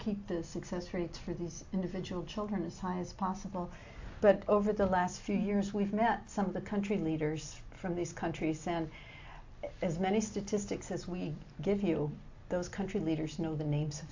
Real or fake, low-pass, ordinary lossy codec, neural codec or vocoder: real; 7.2 kHz; AAC, 48 kbps; none